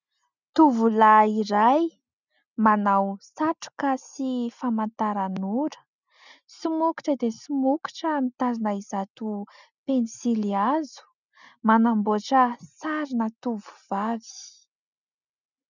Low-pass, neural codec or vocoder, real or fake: 7.2 kHz; none; real